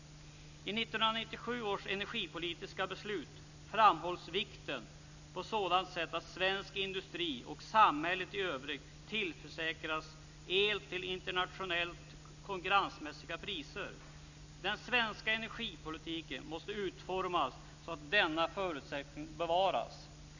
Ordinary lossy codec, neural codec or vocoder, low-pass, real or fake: none; none; 7.2 kHz; real